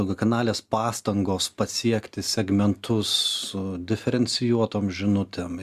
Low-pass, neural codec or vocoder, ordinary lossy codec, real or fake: 14.4 kHz; none; Opus, 64 kbps; real